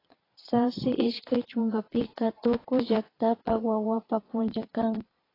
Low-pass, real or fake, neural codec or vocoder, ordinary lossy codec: 5.4 kHz; fake; vocoder, 22.05 kHz, 80 mel bands, Vocos; AAC, 24 kbps